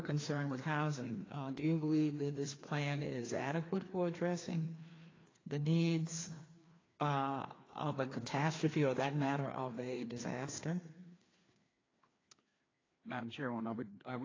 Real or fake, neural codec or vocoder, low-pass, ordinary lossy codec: fake; codec, 16 kHz, 2 kbps, FreqCodec, larger model; 7.2 kHz; AAC, 32 kbps